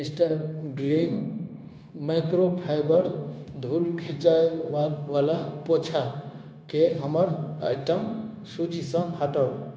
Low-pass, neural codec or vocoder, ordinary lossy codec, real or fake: none; codec, 16 kHz, 0.9 kbps, LongCat-Audio-Codec; none; fake